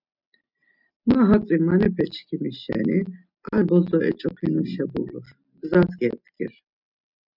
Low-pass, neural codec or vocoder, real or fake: 5.4 kHz; none; real